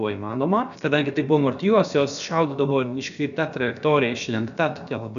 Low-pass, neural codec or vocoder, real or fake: 7.2 kHz; codec, 16 kHz, about 1 kbps, DyCAST, with the encoder's durations; fake